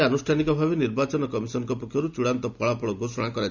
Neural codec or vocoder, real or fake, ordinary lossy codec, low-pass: none; real; none; 7.2 kHz